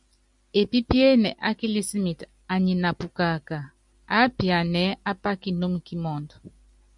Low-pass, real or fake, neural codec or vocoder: 10.8 kHz; real; none